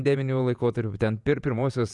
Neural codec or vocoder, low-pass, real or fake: vocoder, 48 kHz, 128 mel bands, Vocos; 10.8 kHz; fake